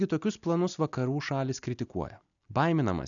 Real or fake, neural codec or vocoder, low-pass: real; none; 7.2 kHz